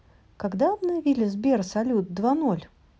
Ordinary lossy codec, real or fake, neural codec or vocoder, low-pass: none; real; none; none